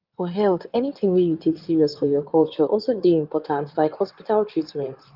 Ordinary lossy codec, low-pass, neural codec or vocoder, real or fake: Opus, 32 kbps; 5.4 kHz; codec, 16 kHz in and 24 kHz out, 2.2 kbps, FireRedTTS-2 codec; fake